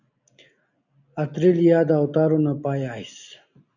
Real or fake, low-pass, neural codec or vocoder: real; 7.2 kHz; none